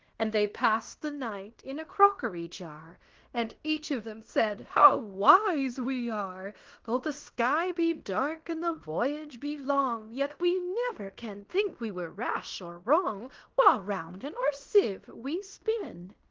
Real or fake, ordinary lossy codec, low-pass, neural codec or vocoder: fake; Opus, 16 kbps; 7.2 kHz; codec, 16 kHz in and 24 kHz out, 0.9 kbps, LongCat-Audio-Codec, fine tuned four codebook decoder